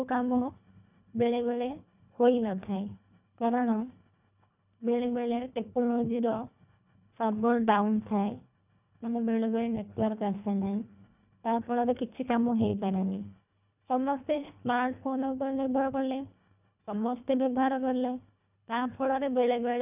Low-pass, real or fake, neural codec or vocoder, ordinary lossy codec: 3.6 kHz; fake; codec, 24 kHz, 1.5 kbps, HILCodec; none